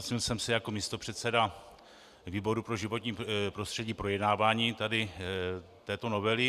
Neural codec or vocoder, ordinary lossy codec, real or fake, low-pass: none; Opus, 64 kbps; real; 14.4 kHz